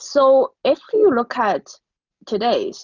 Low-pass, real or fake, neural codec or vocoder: 7.2 kHz; real; none